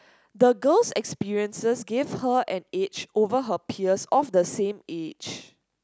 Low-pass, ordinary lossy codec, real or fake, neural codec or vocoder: none; none; real; none